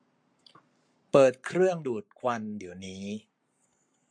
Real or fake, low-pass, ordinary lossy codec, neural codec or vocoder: fake; 9.9 kHz; MP3, 48 kbps; codec, 44.1 kHz, 7.8 kbps, Pupu-Codec